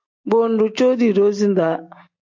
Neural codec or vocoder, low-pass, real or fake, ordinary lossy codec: none; 7.2 kHz; real; MP3, 48 kbps